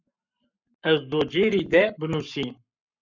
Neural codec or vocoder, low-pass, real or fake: codec, 44.1 kHz, 7.8 kbps, Pupu-Codec; 7.2 kHz; fake